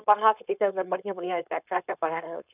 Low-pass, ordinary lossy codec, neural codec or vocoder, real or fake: 3.6 kHz; none; codec, 16 kHz, 4.8 kbps, FACodec; fake